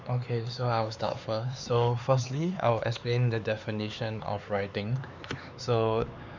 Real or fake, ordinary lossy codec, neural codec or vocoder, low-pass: fake; none; codec, 16 kHz, 4 kbps, X-Codec, HuBERT features, trained on LibriSpeech; 7.2 kHz